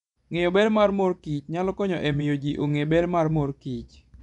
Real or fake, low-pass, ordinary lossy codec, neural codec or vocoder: fake; 10.8 kHz; none; vocoder, 24 kHz, 100 mel bands, Vocos